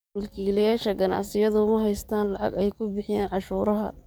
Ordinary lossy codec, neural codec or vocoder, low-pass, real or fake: none; codec, 44.1 kHz, 7.8 kbps, DAC; none; fake